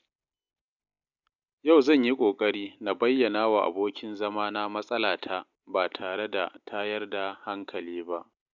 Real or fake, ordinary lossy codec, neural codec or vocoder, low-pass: real; none; none; 7.2 kHz